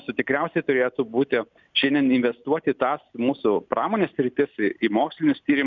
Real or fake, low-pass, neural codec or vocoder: real; 7.2 kHz; none